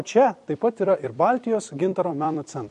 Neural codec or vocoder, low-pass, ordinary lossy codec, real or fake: vocoder, 44.1 kHz, 128 mel bands every 256 samples, BigVGAN v2; 14.4 kHz; MP3, 48 kbps; fake